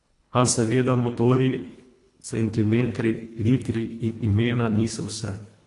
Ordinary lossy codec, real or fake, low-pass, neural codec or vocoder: AAC, 48 kbps; fake; 10.8 kHz; codec, 24 kHz, 1.5 kbps, HILCodec